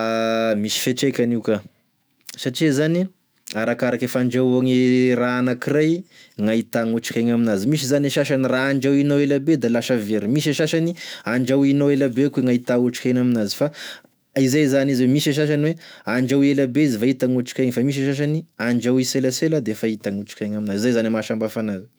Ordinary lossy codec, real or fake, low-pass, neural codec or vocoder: none; fake; none; autoencoder, 48 kHz, 128 numbers a frame, DAC-VAE, trained on Japanese speech